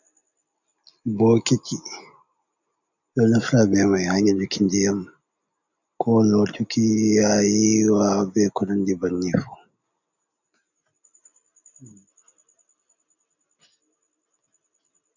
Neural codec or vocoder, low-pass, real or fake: none; 7.2 kHz; real